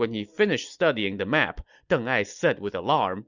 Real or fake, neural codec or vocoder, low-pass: real; none; 7.2 kHz